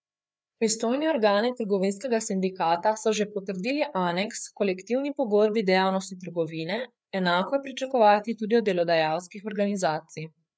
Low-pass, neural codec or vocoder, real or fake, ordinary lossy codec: none; codec, 16 kHz, 4 kbps, FreqCodec, larger model; fake; none